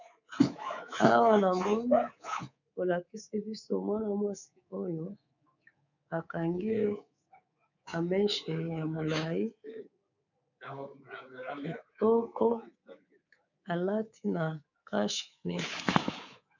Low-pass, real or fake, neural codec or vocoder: 7.2 kHz; fake; codec, 24 kHz, 3.1 kbps, DualCodec